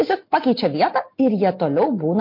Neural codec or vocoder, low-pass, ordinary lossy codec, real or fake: none; 5.4 kHz; MP3, 32 kbps; real